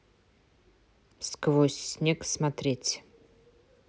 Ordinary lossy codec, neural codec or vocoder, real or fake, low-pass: none; none; real; none